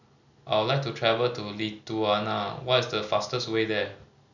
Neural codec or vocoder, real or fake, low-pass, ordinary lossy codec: none; real; 7.2 kHz; none